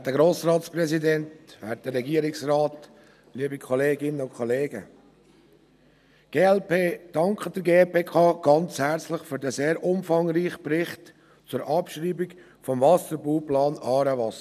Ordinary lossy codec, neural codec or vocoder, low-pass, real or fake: none; none; 14.4 kHz; real